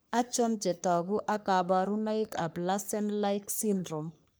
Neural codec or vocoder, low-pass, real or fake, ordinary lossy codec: codec, 44.1 kHz, 3.4 kbps, Pupu-Codec; none; fake; none